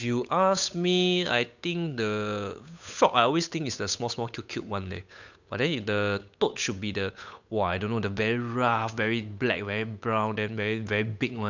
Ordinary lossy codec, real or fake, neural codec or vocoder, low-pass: none; fake; codec, 16 kHz, 8 kbps, FunCodec, trained on Chinese and English, 25 frames a second; 7.2 kHz